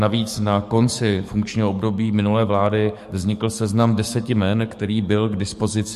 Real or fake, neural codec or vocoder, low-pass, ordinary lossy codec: fake; codec, 44.1 kHz, 7.8 kbps, DAC; 14.4 kHz; MP3, 64 kbps